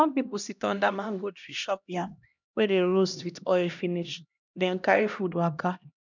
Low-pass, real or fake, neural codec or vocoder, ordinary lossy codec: 7.2 kHz; fake; codec, 16 kHz, 1 kbps, X-Codec, HuBERT features, trained on LibriSpeech; none